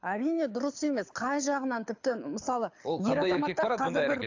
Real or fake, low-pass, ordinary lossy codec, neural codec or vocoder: fake; 7.2 kHz; AAC, 48 kbps; codec, 24 kHz, 6 kbps, HILCodec